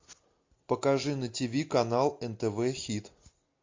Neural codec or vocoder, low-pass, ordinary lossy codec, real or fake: none; 7.2 kHz; MP3, 48 kbps; real